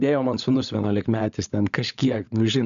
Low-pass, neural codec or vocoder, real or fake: 7.2 kHz; codec, 16 kHz, 16 kbps, FunCodec, trained on LibriTTS, 50 frames a second; fake